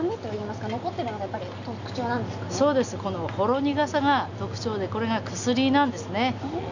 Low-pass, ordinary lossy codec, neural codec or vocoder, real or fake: 7.2 kHz; none; none; real